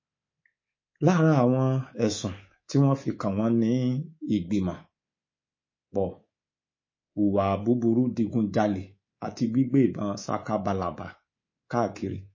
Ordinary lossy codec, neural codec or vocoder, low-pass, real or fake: MP3, 32 kbps; codec, 24 kHz, 3.1 kbps, DualCodec; 7.2 kHz; fake